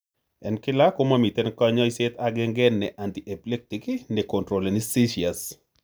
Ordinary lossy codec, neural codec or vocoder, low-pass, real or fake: none; none; none; real